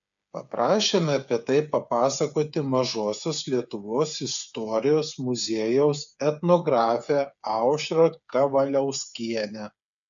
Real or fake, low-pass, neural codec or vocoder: fake; 7.2 kHz; codec, 16 kHz, 8 kbps, FreqCodec, smaller model